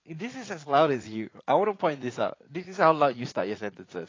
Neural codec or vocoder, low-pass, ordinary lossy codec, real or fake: vocoder, 44.1 kHz, 80 mel bands, Vocos; 7.2 kHz; AAC, 32 kbps; fake